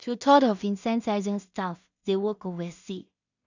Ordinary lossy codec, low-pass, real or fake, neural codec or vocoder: none; 7.2 kHz; fake; codec, 16 kHz in and 24 kHz out, 0.4 kbps, LongCat-Audio-Codec, two codebook decoder